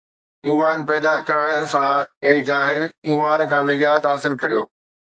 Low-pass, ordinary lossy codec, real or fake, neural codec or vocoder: 9.9 kHz; AAC, 64 kbps; fake; codec, 24 kHz, 0.9 kbps, WavTokenizer, medium music audio release